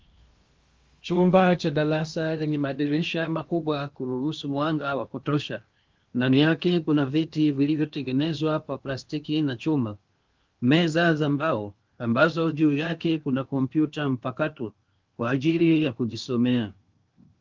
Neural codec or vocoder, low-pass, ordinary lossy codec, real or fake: codec, 16 kHz in and 24 kHz out, 0.8 kbps, FocalCodec, streaming, 65536 codes; 7.2 kHz; Opus, 32 kbps; fake